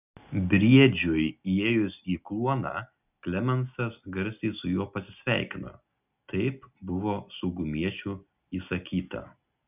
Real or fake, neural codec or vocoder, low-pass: real; none; 3.6 kHz